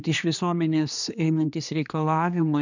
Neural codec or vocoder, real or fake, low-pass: codec, 16 kHz, 2 kbps, X-Codec, HuBERT features, trained on general audio; fake; 7.2 kHz